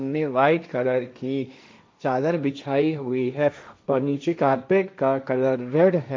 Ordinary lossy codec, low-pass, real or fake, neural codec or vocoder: none; none; fake; codec, 16 kHz, 1.1 kbps, Voila-Tokenizer